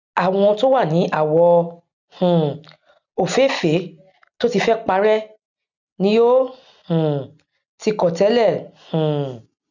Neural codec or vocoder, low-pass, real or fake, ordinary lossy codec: none; 7.2 kHz; real; none